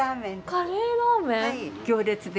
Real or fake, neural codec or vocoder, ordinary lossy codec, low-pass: real; none; none; none